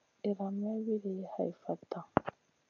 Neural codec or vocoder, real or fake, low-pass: none; real; 7.2 kHz